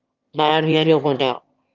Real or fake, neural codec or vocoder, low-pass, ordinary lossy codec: fake; autoencoder, 22.05 kHz, a latent of 192 numbers a frame, VITS, trained on one speaker; 7.2 kHz; Opus, 32 kbps